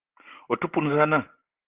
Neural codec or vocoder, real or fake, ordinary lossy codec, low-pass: none; real; Opus, 16 kbps; 3.6 kHz